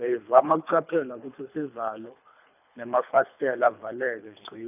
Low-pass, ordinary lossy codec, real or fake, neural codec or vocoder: 3.6 kHz; none; fake; codec, 24 kHz, 3 kbps, HILCodec